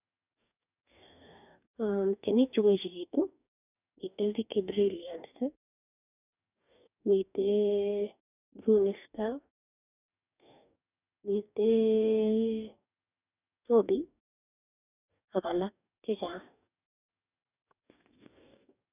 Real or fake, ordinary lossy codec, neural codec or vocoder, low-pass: fake; none; codec, 44.1 kHz, 2.6 kbps, DAC; 3.6 kHz